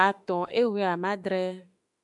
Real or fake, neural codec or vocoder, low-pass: fake; autoencoder, 48 kHz, 32 numbers a frame, DAC-VAE, trained on Japanese speech; 10.8 kHz